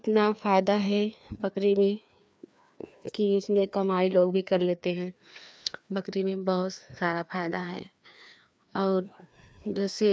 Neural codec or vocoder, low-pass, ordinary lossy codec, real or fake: codec, 16 kHz, 2 kbps, FreqCodec, larger model; none; none; fake